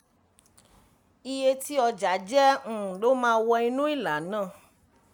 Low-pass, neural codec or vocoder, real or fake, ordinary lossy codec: none; none; real; none